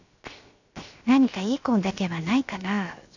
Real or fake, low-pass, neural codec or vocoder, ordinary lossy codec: fake; 7.2 kHz; codec, 16 kHz, 0.7 kbps, FocalCodec; none